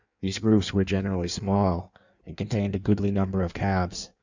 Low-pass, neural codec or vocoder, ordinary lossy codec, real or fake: 7.2 kHz; codec, 16 kHz in and 24 kHz out, 1.1 kbps, FireRedTTS-2 codec; Opus, 64 kbps; fake